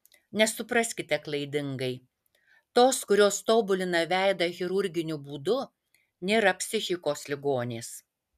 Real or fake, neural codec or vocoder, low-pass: real; none; 14.4 kHz